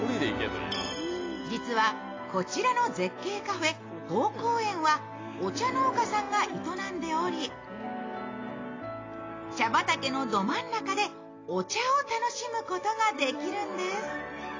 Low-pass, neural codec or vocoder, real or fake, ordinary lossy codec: 7.2 kHz; none; real; AAC, 32 kbps